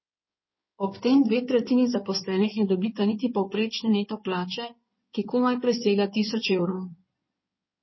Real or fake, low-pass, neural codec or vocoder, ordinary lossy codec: fake; 7.2 kHz; codec, 16 kHz in and 24 kHz out, 2.2 kbps, FireRedTTS-2 codec; MP3, 24 kbps